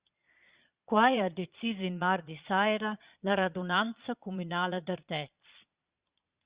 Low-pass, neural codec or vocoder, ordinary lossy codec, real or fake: 3.6 kHz; vocoder, 22.05 kHz, 80 mel bands, Vocos; Opus, 64 kbps; fake